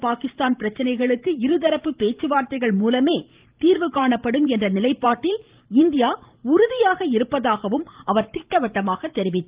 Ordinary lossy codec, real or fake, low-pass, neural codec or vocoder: Opus, 32 kbps; fake; 3.6 kHz; vocoder, 44.1 kHz, 128 mel bands every 512 samples, BigVGAN v2